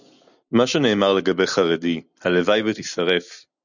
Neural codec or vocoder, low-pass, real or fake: none; 7.2 kHz; real